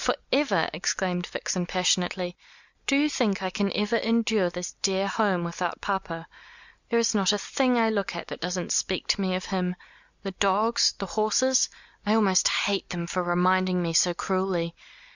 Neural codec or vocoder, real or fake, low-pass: none; real; 7.2 kHz